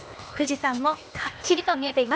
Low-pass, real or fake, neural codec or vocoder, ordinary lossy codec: none; fake; codec, 16 kHz, 0.8 kbps, ZipCodec; none